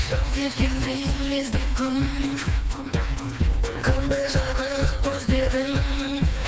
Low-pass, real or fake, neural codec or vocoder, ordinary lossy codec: none; fake; codec, 16 kHz, 2 kbps, FreqCodec, smaller model; none